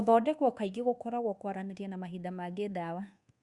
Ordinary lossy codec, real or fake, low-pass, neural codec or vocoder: Opus, 64 kbps; fake; 10.8 kHz; codec, 24 kHz, 1.2 kbps, DualCodec